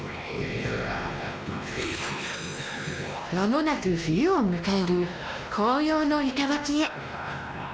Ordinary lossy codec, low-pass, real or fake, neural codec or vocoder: none; none; fake; codec, 16 kHz, 1 kbps, X-Codec, WavLM features, trained on Multilingual LibriSpeech